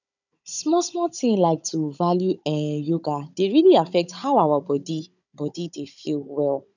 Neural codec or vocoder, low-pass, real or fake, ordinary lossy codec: codec, 16 kHz, 16 kbps, FunCodec, trained on Chinese and English, 50 frames a second; 7.2 kHz; fake; none